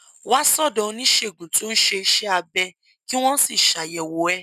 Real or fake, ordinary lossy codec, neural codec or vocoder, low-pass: real; none; none; 14.4 kHz